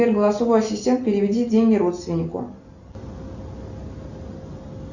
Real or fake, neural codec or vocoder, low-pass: real; none; 7.2 kHz